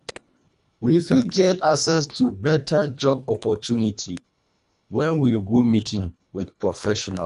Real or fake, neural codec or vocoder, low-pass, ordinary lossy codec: fake; codec, 24 kHz, 1.5 kbps, HILCodec; 10.8 kHz; none